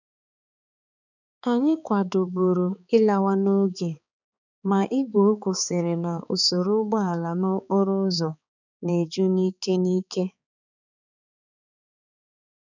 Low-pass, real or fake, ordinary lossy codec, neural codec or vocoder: 7.2 kHz; fake; none; codec, 16 kHz, 4 kbps, X-Codec, HuBERT features, trained on balanced general audio